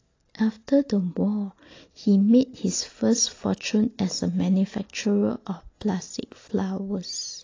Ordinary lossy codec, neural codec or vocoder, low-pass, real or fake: AAC, 32 kbps; none; 7.2 kHz; real